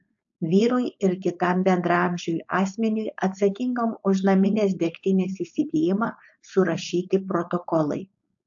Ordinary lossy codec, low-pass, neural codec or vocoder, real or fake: AAC, 64 kbps; 7.2 kHz; codec, 16 kHz, 4.8 kbps, FACodec; fake